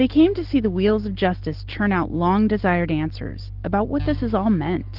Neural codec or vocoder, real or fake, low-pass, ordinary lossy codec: none; real; 5.4 kHz; Opus, 16 kbps